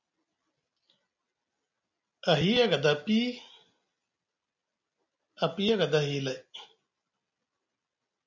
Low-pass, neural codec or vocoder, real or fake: 7.2 kHz; none; real